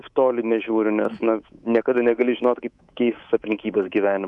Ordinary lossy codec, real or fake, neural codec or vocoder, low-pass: MP3, 64 kbps; fake; codec, 24 kHz, 3.1 kbps, DualCodec; 10.8 kHz